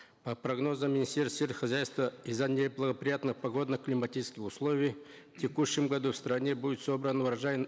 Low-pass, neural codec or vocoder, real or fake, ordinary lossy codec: none; none; real; none